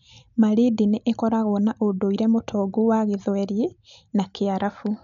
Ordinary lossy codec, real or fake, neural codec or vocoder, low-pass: none; real; none; 7.2 kHz